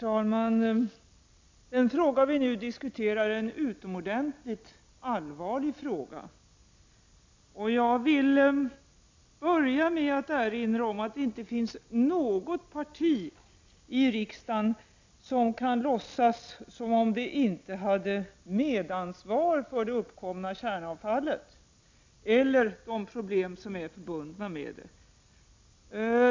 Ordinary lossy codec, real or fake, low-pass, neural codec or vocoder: MP3, 64 kbps; real; 7.2 kHz; none